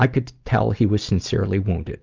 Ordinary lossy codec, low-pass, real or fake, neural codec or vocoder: Opus, 32 kbps; 7.2 kHz; real; none